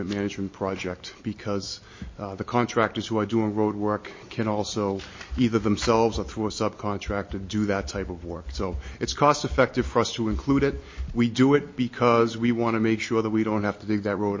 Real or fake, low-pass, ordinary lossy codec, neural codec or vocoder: real; 7.2 kHz; MP3, 32 kbps; none